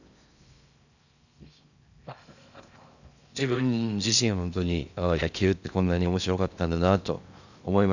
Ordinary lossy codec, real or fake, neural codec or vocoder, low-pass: none; fake; codec, 16 kHz in and 24 kHz out, 0.6 kbps, FocalCodec, streaming, 4096 codes; 7.2 kHz